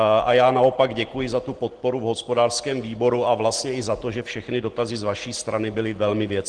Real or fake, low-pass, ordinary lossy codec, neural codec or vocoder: real; 9.9 kHz; Opus, 16 kbps; none